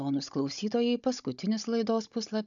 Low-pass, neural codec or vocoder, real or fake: 7.2 kHz; codec, 16 kHz, 16 kbps, FunCodec, trained on Chinese and English, 50 frames a second; fake